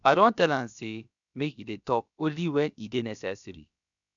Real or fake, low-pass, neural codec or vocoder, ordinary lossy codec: fake; 7.2 kHz; codec, 16 kHz, about 1 kbps, DyCAST, with the encoder's durations; none